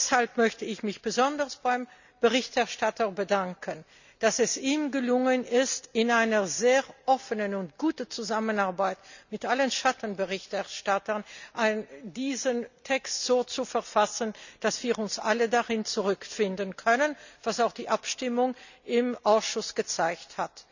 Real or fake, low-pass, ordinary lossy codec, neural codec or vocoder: real; 7.2 kHz; none; none